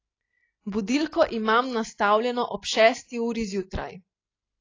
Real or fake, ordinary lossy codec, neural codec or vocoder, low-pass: real; AAC, 32 kbps; none; 7.2 kHz